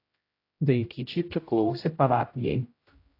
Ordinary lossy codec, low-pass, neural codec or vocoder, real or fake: AAC, 32 kbps; 5.4 kHz; codec, 16 kHz, 0.5 kbps, X-Codec, HuBERT features, trained on general audio; fake